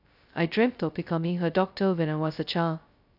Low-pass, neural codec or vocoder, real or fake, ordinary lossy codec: 5.4 kHz; codec, 16 kHz, 0.2 kbps, FocalCodec; fake; none